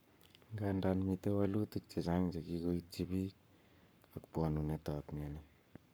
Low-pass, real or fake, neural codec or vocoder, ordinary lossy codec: none; fake; codec, 44.1 kHz, 7.8 kbps, Pupu-Codec; none